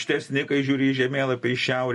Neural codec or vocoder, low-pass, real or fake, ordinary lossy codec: none; 14.4 kHz; real; MP3, 48 kbps